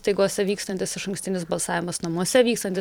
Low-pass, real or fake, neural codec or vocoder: 19.8 kHz; fake; vocoder, 44.1 kHz, 128 mel bands every 512 samples, BigVGAN v2